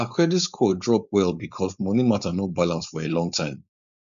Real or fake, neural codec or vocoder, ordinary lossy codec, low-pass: fake; codec, 16 kHz, 4.8 kbps, FACodec; none; 7.2 kHz